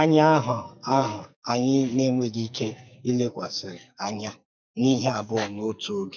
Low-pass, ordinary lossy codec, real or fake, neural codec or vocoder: 7.2 kHz; none; fake; codec, 44.1 kHz, 2.6 kbps, SNAC